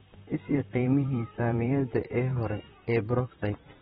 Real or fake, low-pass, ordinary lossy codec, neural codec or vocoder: fake; 19.8 kHz; AAC, 16 kbps; vocoder, 44.1 kHz, 128 mel bands, Pupu-Vocoder